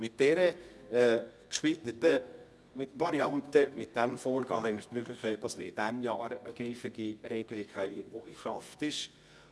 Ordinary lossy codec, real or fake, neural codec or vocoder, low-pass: none; fake; codec, 24 kHz, 0.9 kbps, WavTokenizer, medium music audio release; none